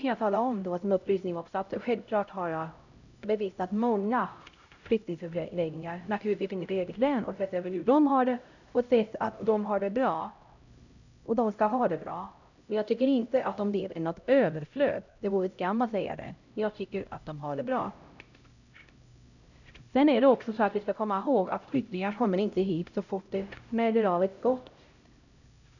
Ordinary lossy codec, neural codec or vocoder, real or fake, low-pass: none; codec, 16 kHz, 0.5 kbps, X-Codec, HuBERT features, trained on LibriSpeech; fake; 7.2 kHz